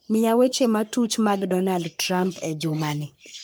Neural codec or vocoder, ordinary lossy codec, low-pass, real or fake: codec, 44.1 kHz, 3.4 kbps, Pupu-Codec; none; none; fake